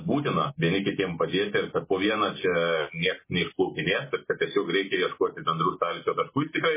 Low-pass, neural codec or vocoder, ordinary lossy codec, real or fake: 3.6 kHz; none; MP3, 16 kbps; real